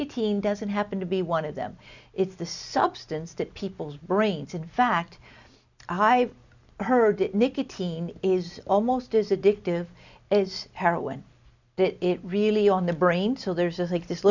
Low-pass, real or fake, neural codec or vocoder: 7.2 kHz; real; none